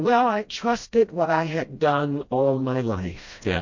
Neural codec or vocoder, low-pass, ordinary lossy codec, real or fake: codec, 16 kHz, 1 kbps, FreqCodec, smaller model; 7.2 kHz; MP3, 48 kbps; fake